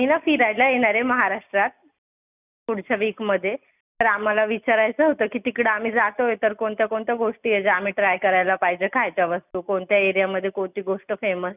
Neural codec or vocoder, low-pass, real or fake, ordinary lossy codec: none; 3.6 kHz; real; none